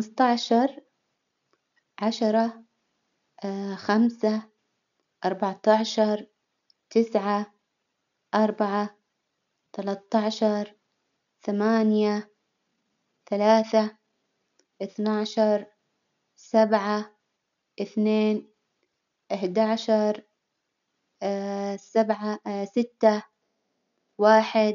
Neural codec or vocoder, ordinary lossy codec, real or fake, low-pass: none; none; real; 7.2 kHz